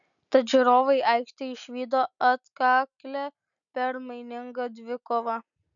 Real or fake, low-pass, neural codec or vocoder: real; 7.2 kHz; none